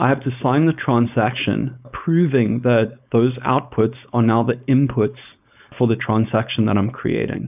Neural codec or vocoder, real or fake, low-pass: codec, 16 kHz, 4.8 kbps, FACodec; fake; 3.6 kHz